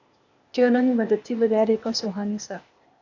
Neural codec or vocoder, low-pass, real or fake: codec, 16 kHz, 0.8 kbps, ZipCodec; 7.2 kHz; fake